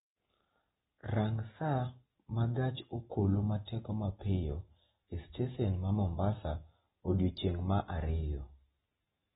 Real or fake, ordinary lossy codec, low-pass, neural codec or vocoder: real; AAC, 16 kbps; 19.8 kHz; none